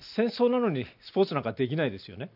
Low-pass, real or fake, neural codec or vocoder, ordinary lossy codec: 5.4 kHz; real; none; none